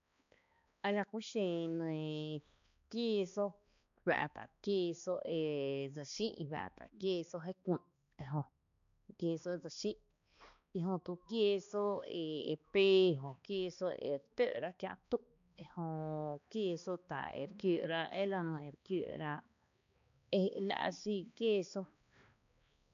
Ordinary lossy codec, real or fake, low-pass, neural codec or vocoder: none; fake; 7.2 kHz; codec, 16 kHz, 2 kbps, X-Codec, HuBERT features, trained on balanced general audio